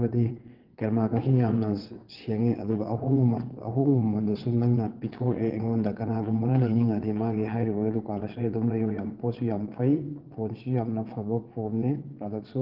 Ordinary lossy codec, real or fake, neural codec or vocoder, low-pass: Opus, 16 kbps; fake; vocoder, 22.05 kHz, 80 mel bands, WaveNeXt; 5.4 kHz